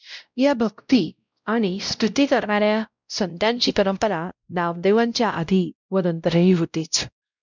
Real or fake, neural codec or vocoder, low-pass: fake; codec, 16 kHz, 0.5 kbps, X-Codec, WavLM features, trained on Multilingual LibriSpeech; 7.2 kHz